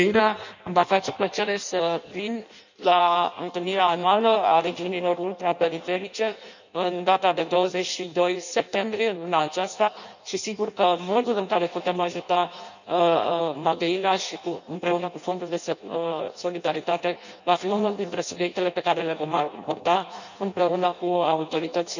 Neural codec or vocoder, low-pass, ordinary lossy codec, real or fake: codec, 16 kHz in and 24 kHz out, 0.6 kbps, FireRedTTS-2 codec; 7.2 kHz; none; fake